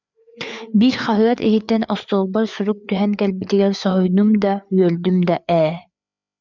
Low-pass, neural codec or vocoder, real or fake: 7.2 kHz; codec, 16 kHz, 4 kbps, FreqCodec, larger model; fake